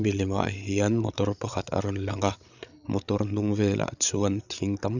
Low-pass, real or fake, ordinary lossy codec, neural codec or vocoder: 7.2 kHz; fake; none; codec, 16 kHz, 8 kbps, FreqCodec, larger model